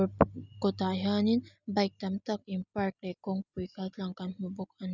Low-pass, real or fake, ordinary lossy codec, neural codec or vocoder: 7.2 kHz; real; none; none